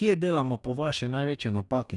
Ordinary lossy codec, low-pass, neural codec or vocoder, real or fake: none; 10.8 kHz; codec, 44.1 kHz, 2.6 kbps, DAC; fake